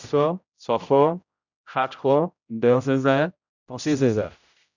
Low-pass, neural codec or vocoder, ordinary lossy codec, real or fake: 7.2 kHz; codec, 16 kHz, 0.5 kbps, X-Codec, HuBERT features, trained on general audio; none; fake